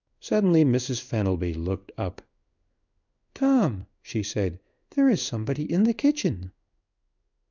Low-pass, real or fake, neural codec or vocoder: 7.2 kHz; fake; codec, 16 kHz in and 24 kHz out, 1 kbps, XY-Tokenizer